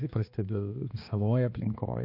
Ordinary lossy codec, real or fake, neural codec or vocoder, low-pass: MP3, 32 kbps; fake; codec, 16 kHz, 2 kbps, X-Codec, HuBERT features, trained on balanced general audio; 5.4 kHz